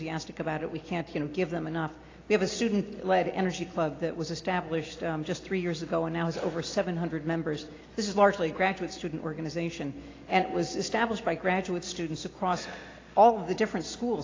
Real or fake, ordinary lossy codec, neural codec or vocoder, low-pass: real; AAC, 32 kbps; none; 7.2 kHz